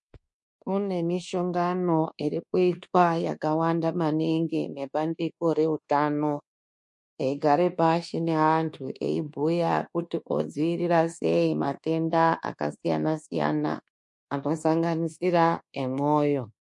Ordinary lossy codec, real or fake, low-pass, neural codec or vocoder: MP3, 48 kbps; fake; 10.8 kHz; codec, 24 kHz, 1.2 kbps, DualCodec